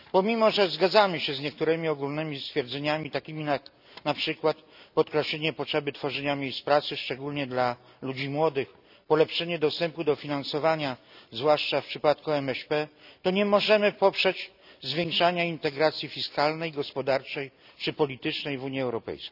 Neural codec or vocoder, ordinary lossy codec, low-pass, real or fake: none; none; 5.4 kHz; real